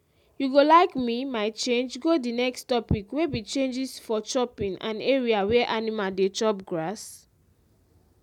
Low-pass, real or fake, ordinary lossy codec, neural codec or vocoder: 19.8 kHz; real; none; none